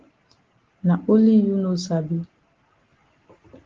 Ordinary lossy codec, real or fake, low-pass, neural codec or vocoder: Opus, 16 kbps; real; 7.2 kHz; none